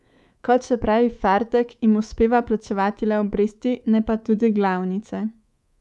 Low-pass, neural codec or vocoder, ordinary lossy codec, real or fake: none; codec, 24 kHz, 3.1 kbps, DualCodec; none; fake